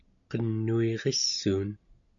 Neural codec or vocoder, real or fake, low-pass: none; real; 7.2 kHz